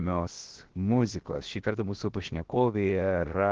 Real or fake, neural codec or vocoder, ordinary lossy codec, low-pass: fake; codec, 16 kHz, 0.7 kbps, FocalCodec; Opus, 16 kbps; 7.2 kHz